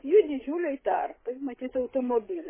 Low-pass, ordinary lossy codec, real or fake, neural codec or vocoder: 3.6 kHz; MP3, 16 kbps; fake; codec, 16 kHz, 8 kbps, FreqCodec, larger model